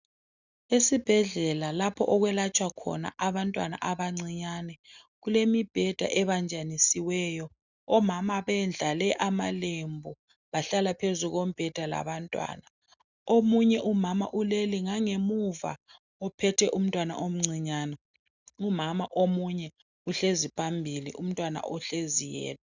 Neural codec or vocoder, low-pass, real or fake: none; 7.2 kHz; real